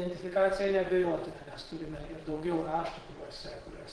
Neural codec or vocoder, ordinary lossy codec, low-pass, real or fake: vocoder, 22.05 kHz, 80 mel bands, Vocos; Opus, 16 kbps; 9.9 kHz; fake